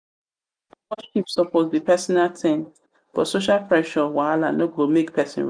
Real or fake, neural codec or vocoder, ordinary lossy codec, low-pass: real; none; none; 9.9 kHz